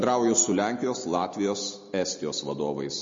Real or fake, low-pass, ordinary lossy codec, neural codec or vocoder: real; 7.2 kHz; MP3, 32 kbps; none